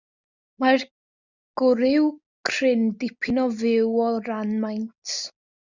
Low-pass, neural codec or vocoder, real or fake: 7.2 kHz; none; real